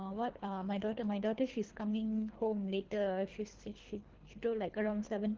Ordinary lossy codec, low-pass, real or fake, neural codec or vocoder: Opus, 16 kbps; 7.2 kHz; fake; codec, 24 kHz, 3 kbps, HILCodec